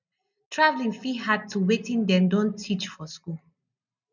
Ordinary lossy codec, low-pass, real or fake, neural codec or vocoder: none; 7.2 kHz; real; none